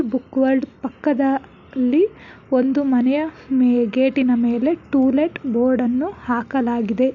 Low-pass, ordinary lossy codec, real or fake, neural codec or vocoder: 7.2 kHz; none; real; none